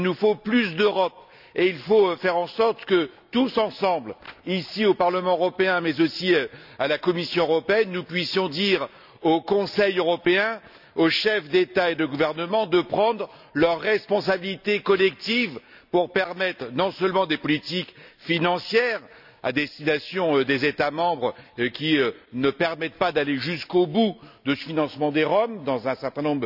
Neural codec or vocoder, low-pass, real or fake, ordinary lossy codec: none; 5.4 kHz; real; none